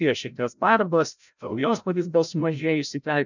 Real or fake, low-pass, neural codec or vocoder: fake; 7.2 kHz; codec, 16 kHz, 0.5 kbps, FreqCodec, larger model